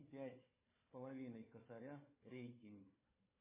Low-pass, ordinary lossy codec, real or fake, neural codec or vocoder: 3.6 kHz; MP3, 16 kbps; fake; codec, 16 kHz, 4 kbps, FunCodec, trained on Chinese and English, 50 frames a second